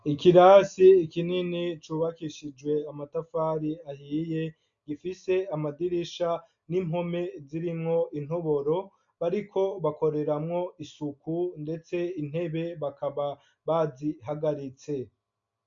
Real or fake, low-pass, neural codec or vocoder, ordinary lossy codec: real; 7.2 kHz; none; MP3, 64 kbps